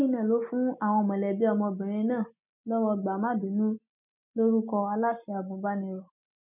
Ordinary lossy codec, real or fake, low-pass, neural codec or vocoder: none; real; 3.6 kHz; none